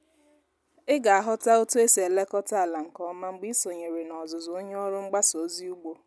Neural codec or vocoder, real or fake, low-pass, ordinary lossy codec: none; real; none; none